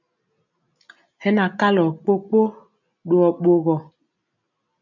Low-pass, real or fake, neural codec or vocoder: 7.2 kHz; real; none